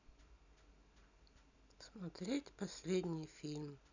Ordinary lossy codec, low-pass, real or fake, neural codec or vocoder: none; 7.2 kHz; real; none